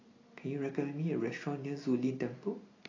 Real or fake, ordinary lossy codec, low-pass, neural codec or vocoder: real; AAC, 32 kbps; 7.2 kHz; none